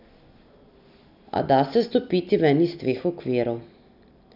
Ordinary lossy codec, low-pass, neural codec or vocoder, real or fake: none; 5.4 kHz; none; real